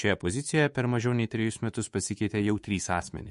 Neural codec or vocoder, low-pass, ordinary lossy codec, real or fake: codec, 24 kHz, 3.1 kbps, DualCodec; 10.8 kHz; MP3, 48 kbps; fake